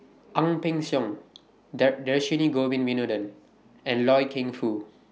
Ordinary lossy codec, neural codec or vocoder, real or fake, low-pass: none; none; real; none